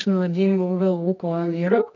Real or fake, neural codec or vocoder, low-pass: fake; codec, 24 kHz, 0.9 kbps, WavTokenizer, medium music audio release; 7.2 kHz